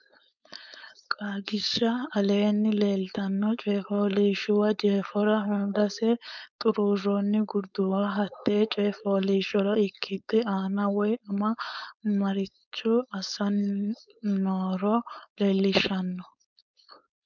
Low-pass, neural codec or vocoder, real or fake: 7.2 kHz; codec, 16 kHz, 4.8 kbps, FACodec; fake